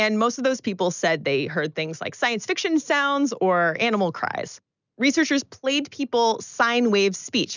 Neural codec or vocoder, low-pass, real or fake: none; 7.2 kHz; real